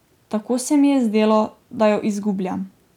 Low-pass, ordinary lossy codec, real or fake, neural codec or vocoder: 19.8 kHz; none; real; none